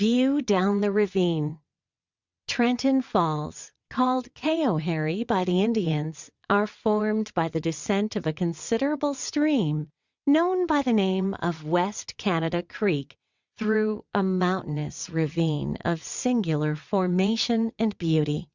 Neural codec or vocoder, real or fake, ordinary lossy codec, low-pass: vocoder, 22.05 kHz, 80 mel bands, WaveNeXt; fake; Opus, 64 kbps; 7.2 kHz